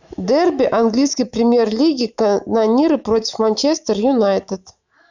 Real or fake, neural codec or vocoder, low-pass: fake; autoencoder, 48 kHz, 128 numbers a frame, DAC-VAE, trained on Japanese speech; 7.2 kHz